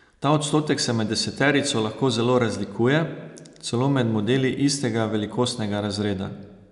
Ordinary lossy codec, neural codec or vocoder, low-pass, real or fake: none; none; 10.8 kHz; real